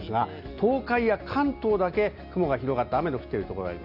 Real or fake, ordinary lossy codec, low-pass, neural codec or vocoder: real; none; 5.4 kHz; none